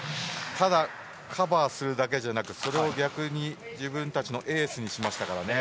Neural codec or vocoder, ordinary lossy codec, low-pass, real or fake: none; none; none; real